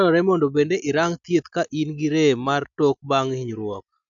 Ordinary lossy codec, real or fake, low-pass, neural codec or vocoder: none; real; 7.2 kHz; none